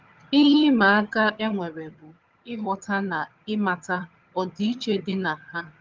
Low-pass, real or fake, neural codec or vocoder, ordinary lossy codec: 7.2 kHz; fake; vocoder, 22.05 kHz, 80 mel bands, HiFi-GAN; Opus, 24 kbps